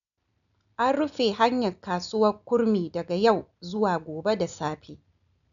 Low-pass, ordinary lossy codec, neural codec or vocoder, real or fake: 7.2 kHz; none; none; real